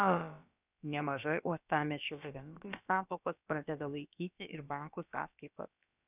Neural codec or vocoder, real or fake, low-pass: codec, 16 kHz, about 1 kbps, DyCAST, with the encoder's durations; fake; 3.6 kHz